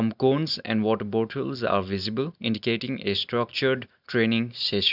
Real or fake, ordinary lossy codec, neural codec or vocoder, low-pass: real; none; none; 5.4 kHz